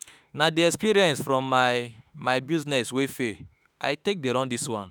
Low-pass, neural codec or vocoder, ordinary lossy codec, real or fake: none; autoencoder, 48 kHz, 32 numbers a frame, DAC-VAE, trained on Japanese speech; none; fake